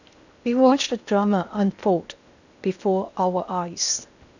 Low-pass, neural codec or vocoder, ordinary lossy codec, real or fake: 7.2 kHz; codec, 16 kHz in and 24 kHz out, 0.8 kbps, FocalCodec, streaming, 65536 codes; none; fake